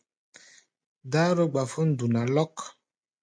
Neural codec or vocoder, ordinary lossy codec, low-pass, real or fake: none; AAC, 64 kbps; 9.9 kHz; real